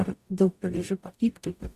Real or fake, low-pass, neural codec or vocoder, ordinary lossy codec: fake; 14.4 kHz; codec, 44.1 kHz, 0.9 kbps, DAC; Opus, 64 kbps